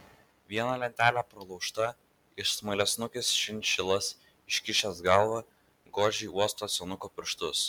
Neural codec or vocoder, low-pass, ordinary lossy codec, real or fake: none; 19.8 kHz; MP3, 96 kbps; real